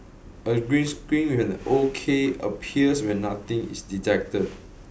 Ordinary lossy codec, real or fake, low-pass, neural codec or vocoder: none; real; none; none